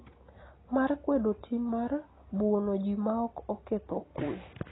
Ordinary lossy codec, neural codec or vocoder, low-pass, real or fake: AAC, 16 kbps; none; 7.2 kHz; real